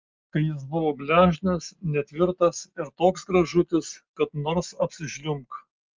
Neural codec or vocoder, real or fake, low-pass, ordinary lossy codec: none; real; 7.2 kHz; Opus, 32 kbps